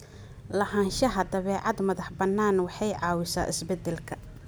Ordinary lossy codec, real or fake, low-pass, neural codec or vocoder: none; real; none; none